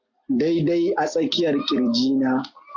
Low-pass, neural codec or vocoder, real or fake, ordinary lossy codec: 7.2 kHz; none; real; AAC, 48 kbps